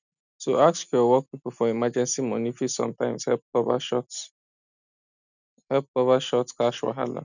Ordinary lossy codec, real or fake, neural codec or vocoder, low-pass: none; real; none; 7.2 kHz